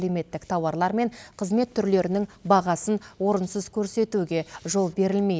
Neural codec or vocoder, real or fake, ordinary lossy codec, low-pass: none; real; none; none